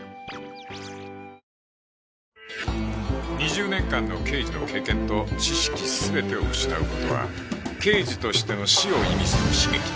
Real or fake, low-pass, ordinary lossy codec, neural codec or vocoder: real; none; none; none